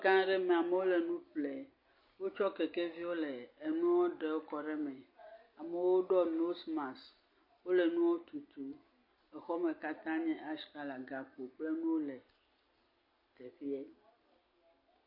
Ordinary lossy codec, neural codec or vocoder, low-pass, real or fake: MP3, 32 kbps; none; 5.4 kHz; real